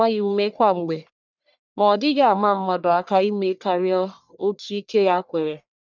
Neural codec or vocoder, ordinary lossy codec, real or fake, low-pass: codec, 44.1 kHz, 1.7 kbps, Pupu-Codec; none; fake; 7.2 kHz